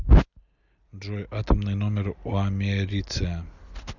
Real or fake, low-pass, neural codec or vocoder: real; 7.2 kHz; none